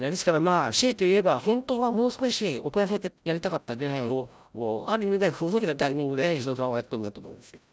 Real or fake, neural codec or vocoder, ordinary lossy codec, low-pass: fake; codec, 16 kHz, 0.5 kbps, FreqCodec, larger model; none; none